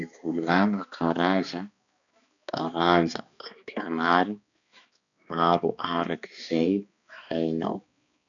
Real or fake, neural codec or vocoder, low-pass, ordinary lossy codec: fake; codec, 16 kHz, 2 kbps, X-Codec, HuBERT features, trained on balanced general audio; 7.2 kHz; none